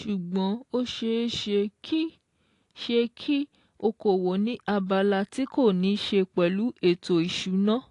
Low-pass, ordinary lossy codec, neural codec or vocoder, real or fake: 10.8 kHz; AAC, 48 kbps; none; real